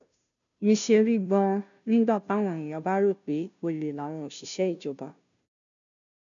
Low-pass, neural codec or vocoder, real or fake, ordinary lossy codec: 7.2 kHz; codec, 16 kHz, 0.5 kbps, FunCodec, trained on Chinese and English, 25 frames a second; fake; none